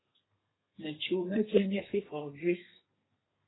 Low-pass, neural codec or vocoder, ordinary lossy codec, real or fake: 7.2 kHz; codec, 32 kHz, 1.9 kbps, SNAC; AAC, 16 kbps; fake